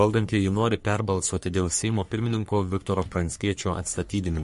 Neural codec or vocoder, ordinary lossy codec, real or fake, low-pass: codec, 44.1 kHz, 3.4 kbps, Pupu-Codec; MP3, 48 kbps; fake; 14.4 kHz